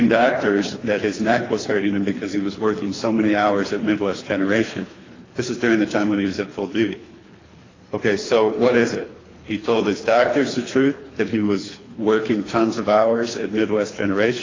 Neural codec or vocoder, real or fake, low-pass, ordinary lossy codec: codec, 24 kHz, 3 kbps, HILCodec; fake; 7.2 kHz; AAC, 32 kbps